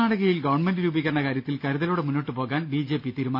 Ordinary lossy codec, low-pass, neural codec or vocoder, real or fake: none; 5.4 kHz; none; real